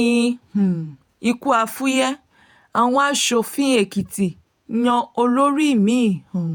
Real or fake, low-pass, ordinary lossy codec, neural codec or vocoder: fake; none; none; vocoder, 48 kHz, 128 mel bands, Vocos